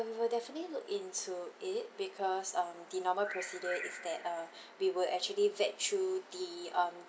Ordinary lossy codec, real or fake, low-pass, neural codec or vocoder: none; real; none; none